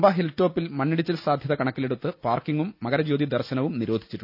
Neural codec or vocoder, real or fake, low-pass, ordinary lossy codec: none; real; 5.4 kHz; none